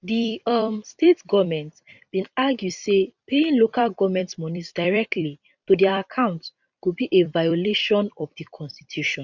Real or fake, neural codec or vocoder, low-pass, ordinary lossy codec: fake; vocoder, 44.1 kHz, 128 mel bands every 256 samples, BigVGAN v2; 7.2 kHz; none